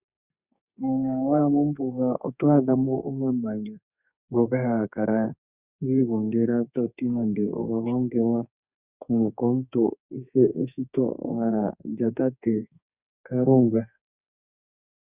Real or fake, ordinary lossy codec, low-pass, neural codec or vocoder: fake; Opus, 64 kbps; 3.6 kHz; codec, 32 kHz, 1.9 kbps, SNAC